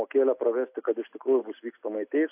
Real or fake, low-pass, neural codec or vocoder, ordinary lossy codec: real; 3.6 kHz; none; AAC, 32 kbps